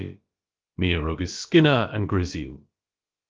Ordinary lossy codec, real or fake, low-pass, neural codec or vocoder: Opus, 24 kbps; fake; 7.2 kHz; codec, 16 kHz, about 1 kbps, DyCAST, with the encoder's durations